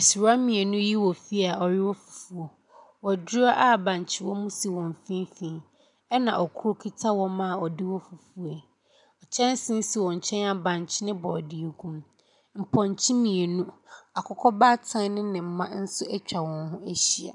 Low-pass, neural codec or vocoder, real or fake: 10.8 kHz; none; real